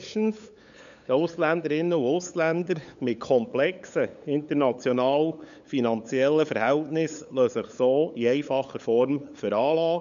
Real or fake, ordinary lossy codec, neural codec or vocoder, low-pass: fake; none; codec, 16 kHz, 8 kbps, FunCodec, trained on LibriTTS, 25 frames a second; 7.2 kHz